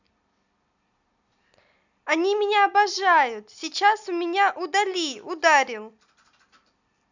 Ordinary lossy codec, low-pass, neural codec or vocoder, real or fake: none; 7.2 kHz; none; real